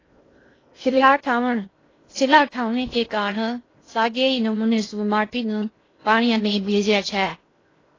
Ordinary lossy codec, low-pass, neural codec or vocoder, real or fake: AAC, 32 kbps; 7.2 kHz; codec, 16 kHz in and 24 kHz out, 0.6 kbps, FocalCodec, streaming, 2048 codes; fake